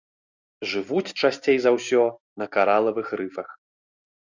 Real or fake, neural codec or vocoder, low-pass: real; none; 7.2 kHz